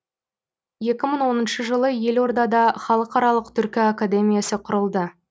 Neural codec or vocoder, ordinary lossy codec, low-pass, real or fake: none; none; none; real